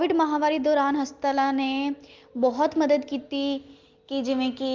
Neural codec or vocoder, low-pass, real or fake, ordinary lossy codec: none; 7.2 kHz; real; Opus, 32 kbps